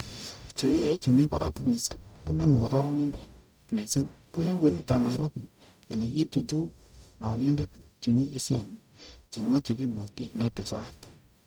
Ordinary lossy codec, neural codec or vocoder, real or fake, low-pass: none; codec, 44.1 kHz, 0.9 kbps, DAC; fake; none